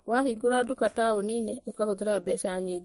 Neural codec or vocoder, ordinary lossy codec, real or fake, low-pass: codec, 32 kHz, 1.9 kbps, SNAC; MP3, 48 kbps; fake; 14.4 kHz